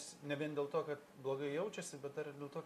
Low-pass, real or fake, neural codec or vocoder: 14.4 kHz; real; none